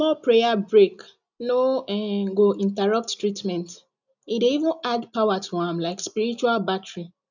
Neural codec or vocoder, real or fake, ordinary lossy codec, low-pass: none; real; none; 7.2 kHz